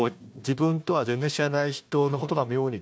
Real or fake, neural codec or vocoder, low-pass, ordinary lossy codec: fake; codec, 16 kHz, 1 kbps, FunCodec, trained on Chinese and English, 50 frames a second; none; none